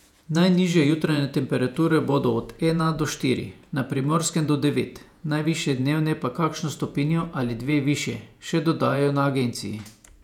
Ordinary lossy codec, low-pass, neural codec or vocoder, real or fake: none; 19.8 kHz; vocoder, 48 kHz, 128 mel bands, Vocos; fake